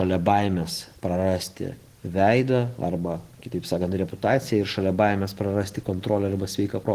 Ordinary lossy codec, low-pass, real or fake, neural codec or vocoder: Opus, 16 kbps; 14.4 kHz; real; none